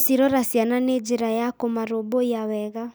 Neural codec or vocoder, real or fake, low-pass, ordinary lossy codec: none; real; none; none